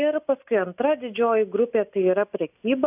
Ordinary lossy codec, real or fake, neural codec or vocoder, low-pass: AAC, 32 kbps; real; none; 3.6 kHz